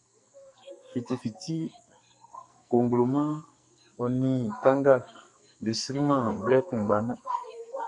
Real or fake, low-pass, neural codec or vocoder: fake; 10.8 kHz; codec, 44.1 kHz, 2.6 kbps, SNAC